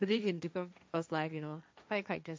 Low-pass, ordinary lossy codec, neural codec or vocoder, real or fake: none; none; codec, 16 kHz, 1.1 kbps, Voila-Tokenizer; fake